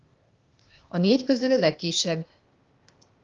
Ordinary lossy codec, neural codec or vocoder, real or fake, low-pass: Opus, 32 kbps; codec, 16 kHz, 0.8 kbps, ZipCodec; fake; 7.2 kHz